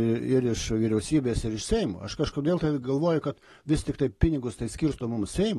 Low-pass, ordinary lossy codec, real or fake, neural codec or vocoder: 19.8 kHz; AAC, 32 kbps; real; none